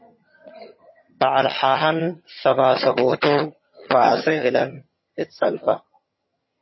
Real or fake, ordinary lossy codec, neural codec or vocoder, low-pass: fake; MP3, 24 kbps; vocoder, 22.05 kHz, 80 mel bands, HiFi-GAN; 7.2 kHz